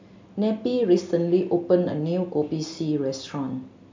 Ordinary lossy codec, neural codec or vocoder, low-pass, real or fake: MP3, 64 kbps; none; 7.2 kHz; real